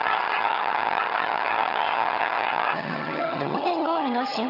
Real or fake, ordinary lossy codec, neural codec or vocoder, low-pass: fake; none; vocoder, 22.05 kHz, 80 mel bands, HiFi-GAN; 5.4 kHz